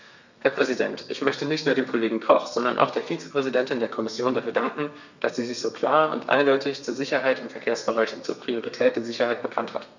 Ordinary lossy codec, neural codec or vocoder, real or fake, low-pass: none; codec, 32 kHz, 1.9 kbps, SNAC; fake; 7.2 kHz